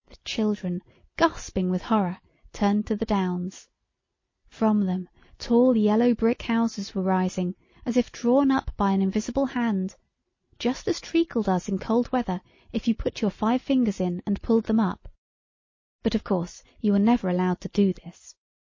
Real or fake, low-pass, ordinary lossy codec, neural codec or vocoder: real; 7.2 kHz; MP3, 32 kbps; none